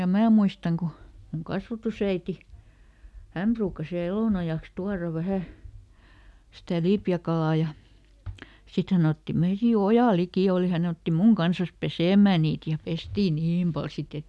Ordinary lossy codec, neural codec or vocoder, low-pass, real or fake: none; none; none; real